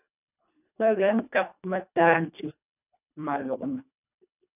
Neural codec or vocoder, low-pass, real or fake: codec, 24 kHz, 1.5 kbps, HILCodec; 3.6 kHz; fake